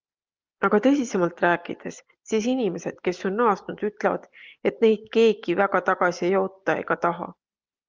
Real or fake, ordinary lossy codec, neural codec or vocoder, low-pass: real; Opus, 32 kbps; none; 7.2 kHz